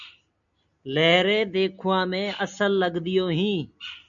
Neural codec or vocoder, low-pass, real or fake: none; 7.2 kHz; real